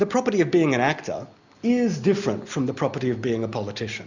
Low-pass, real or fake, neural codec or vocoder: 7.2 kHz; real; none